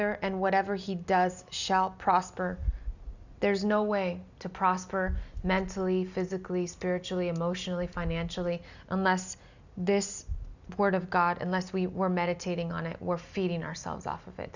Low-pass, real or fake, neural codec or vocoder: 7.2 kHz; real; none